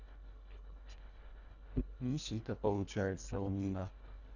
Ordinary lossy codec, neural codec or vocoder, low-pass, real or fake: none; codec, 24 kHz, 1.5 kbps, HILCodec; 7.2 kHz; fake